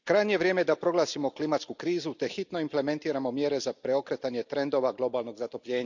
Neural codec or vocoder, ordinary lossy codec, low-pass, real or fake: none; none; 7.2 kHz; real